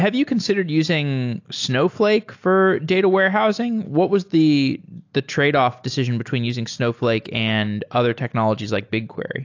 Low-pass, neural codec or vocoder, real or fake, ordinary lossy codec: 7.2 kHz; none; real; AAC, 48 kbps